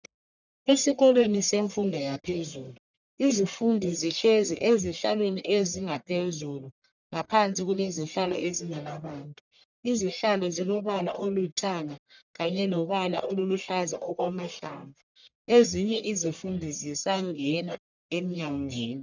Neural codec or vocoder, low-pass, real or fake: codec, 44.1 kHz, 1.7 kbps, Pupu-Codec; 7.2 kHz; fake